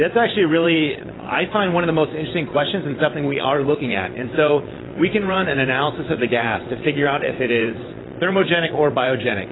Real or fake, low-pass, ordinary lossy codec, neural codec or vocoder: fake; 7.2 kHz; AAC, 16 kbps; vocoder, 22.05 kHz, 80 mel bands, WaveNeXt